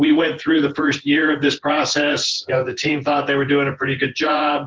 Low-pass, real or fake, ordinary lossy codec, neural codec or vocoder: 7.2 kHz; fake; Opus, 16 kbps; vocoder, 24 kHz, 100 mel bands, Vocos